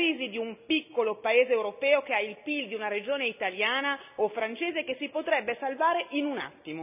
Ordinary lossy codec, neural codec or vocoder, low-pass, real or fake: none; none; 3.6 kHz; real